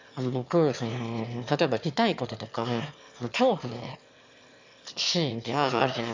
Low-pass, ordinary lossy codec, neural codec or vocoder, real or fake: 7.2 kHz; MP3, 64 kbps; autoencoder, 22.05 kHz, a latent of 192 numbers a frame, VITS, trained on one speaker; fake